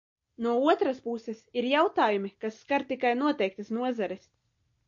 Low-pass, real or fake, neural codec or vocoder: 7.2 kHz; real; none